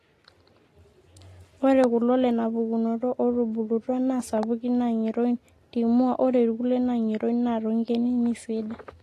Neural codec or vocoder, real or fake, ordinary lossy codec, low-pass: none; real; AAC, 48 kbps; 14.4 kHz